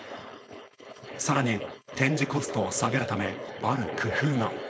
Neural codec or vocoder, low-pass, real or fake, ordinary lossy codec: codec, 16 kHz, 4.8 kbps, FACodec; none; fake; none